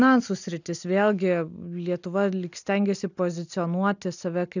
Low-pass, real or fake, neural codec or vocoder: 7.2 kHz; real; none